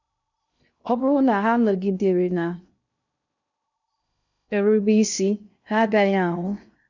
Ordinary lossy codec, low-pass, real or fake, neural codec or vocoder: none; 7.2 kHz; fake; codec, 16 kHz in and 24 kHz out, 0.6 kbps, FocalCodec, streaming, 2048 codes